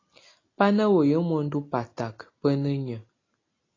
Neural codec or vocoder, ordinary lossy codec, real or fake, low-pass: none; AAC, 32 kbps; real; 7.2 kHz